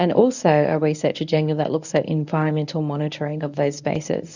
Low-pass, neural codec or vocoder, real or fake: 7.2 kHz; codec, 24 kHz, 0.9 kbps, WavTokenizer, medium speech release version 1; fake